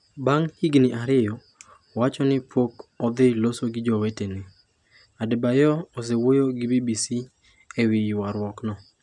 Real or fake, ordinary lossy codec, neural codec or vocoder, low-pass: real; none; none; 10.8 kHz